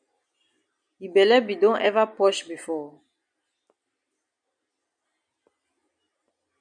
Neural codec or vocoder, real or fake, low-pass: none; real; 9.9 kHz